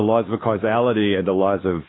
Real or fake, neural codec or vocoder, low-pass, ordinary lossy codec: fake; codec, 16 kHz, 2 kbps, X-Codec, WavLM features, trained on Multilingual LibriSpeech; 7.2 kHz; AAC, 16 kbps